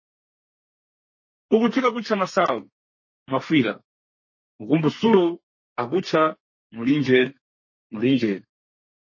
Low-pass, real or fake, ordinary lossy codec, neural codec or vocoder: 7.2 kHz; fake; MP3, 32 kbps; codec, 32 kHz, 1.9 kbps, SNAC